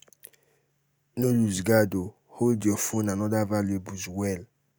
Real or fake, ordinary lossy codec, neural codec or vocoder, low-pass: real; none; none; none